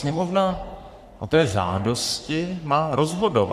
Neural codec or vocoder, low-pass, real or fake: codec, 44.1 kHz, 3.4 kbps, Pupu-Codec; 14.4 kHz; fake